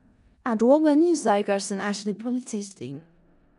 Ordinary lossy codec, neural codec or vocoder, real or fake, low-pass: none; codec, 16 kHz in and 24 kHz out, 0.4 kbps, LongCat-Audio-Codec, four codebook decoder; fake; 10.8 kHz